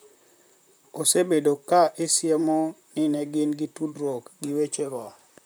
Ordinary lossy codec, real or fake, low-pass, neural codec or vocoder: none; fake; none; vocoder, 44.1 kHz, 128 mel bands, Pupu-Vocoder